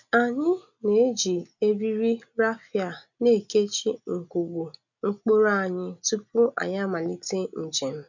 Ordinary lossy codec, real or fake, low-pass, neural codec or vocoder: none; real; 7.2 kHz; none